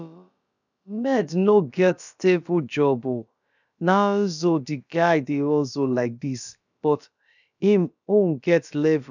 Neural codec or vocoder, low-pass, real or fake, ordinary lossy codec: codec, 16 kHz, about 1 kbps, DyCAST, with the encoder's durations; 7.2 kHz; fake; none